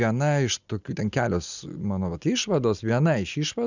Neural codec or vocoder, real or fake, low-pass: none; real; 7.2 kHz